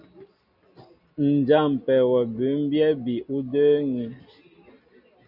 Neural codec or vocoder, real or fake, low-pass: none; real; 5.4 kHz